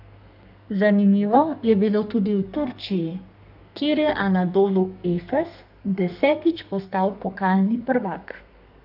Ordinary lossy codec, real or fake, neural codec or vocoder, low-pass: none; fake; codec, 32 kHz, 1.9 kbps, SNAC; 5.4 kHz